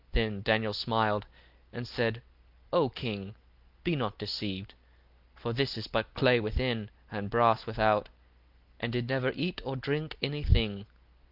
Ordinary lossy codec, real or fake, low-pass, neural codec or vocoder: Opus, 24 kbps; real; 5.4 kHz; none